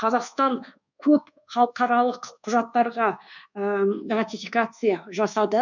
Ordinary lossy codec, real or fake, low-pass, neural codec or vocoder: none; fake; 7.2 kHz; codec, 24 kHz, 1.2 kbps, DualCodec